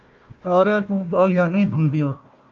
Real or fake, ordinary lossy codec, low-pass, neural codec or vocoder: fake; Opus, 24 kbps; 7.2 kHz; codec, 16 kHz, 1 kbps, FunCodec, trained on Chinese and English, 50 frames a second